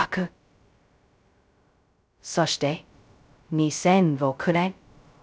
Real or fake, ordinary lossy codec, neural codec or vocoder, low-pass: fake; none; codec, 16 kHz, 0.2 kbps, FocalCodec; none